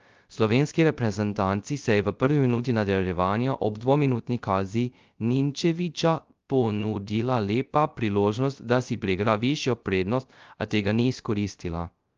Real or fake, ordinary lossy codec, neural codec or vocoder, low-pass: fake; Opus, 32 kbps; codec, 16 kHz, 0.3 kbps, FocalCodec; 7.2 kHz